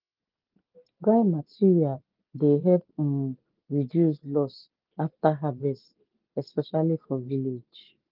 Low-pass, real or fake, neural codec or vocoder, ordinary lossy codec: 5.4 kHz; real; none; Opus, 32 kbps